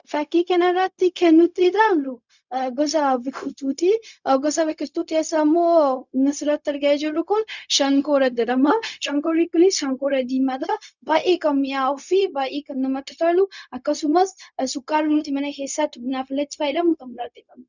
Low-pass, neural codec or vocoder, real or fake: 7.2 kHz; codec, 16 kHz, 0.4 kbps, LongCat-Audio-Codec; fake